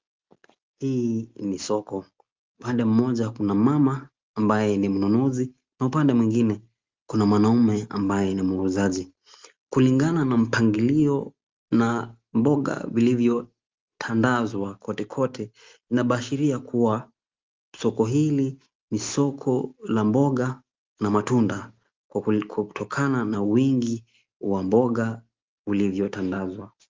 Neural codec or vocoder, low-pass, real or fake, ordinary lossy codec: none; 7.2 kHz; real; Opus, 32 kbps